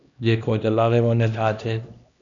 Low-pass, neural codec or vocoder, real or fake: 7.2 kHz; codec, 16 kHz, 1 kbps, X-Codec, HuBERT features, trained on LibriSpeech; fake